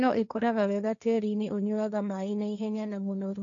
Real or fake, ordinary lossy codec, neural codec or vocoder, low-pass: fake; none; codec, 16 kHz, 1.1 kbps, Voila-Tokenizer; 7.2 kHz